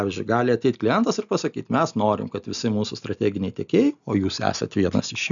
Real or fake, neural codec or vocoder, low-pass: real; none; 7.2 kHz